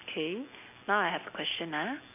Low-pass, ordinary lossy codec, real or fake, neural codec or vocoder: 3.6 kHz; none; real; none